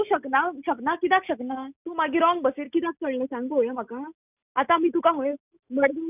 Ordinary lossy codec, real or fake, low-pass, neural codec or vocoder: none; real; 3.6 kHz; none